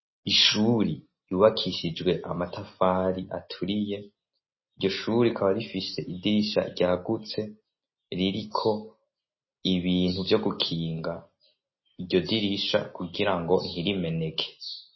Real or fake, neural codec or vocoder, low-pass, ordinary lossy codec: real; none; 7.2 kHz; MP3, 24 kbps